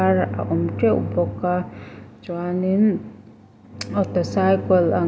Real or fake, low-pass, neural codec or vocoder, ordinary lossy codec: real; none; none; none